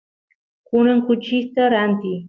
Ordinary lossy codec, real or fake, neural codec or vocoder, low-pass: Opus, 32 kbps; real; none; 7.2 kHz